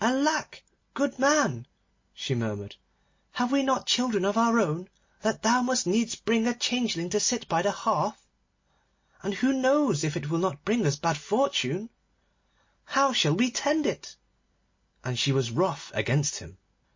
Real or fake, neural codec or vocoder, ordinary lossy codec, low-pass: real; none; MP3, 32 kbps; 7.2 kHz